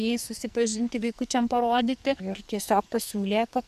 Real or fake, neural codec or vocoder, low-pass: fake; codec, 44.1 kHz, 2.6 kbps, SNAC; 14.4 kHz